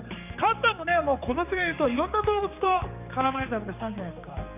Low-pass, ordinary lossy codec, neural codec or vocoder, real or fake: 3.6 kHz; AAC, 32 kbps; codec, 16 kHz, 4 kbps, X-Codec, HuBERT features, trained on general audio; fake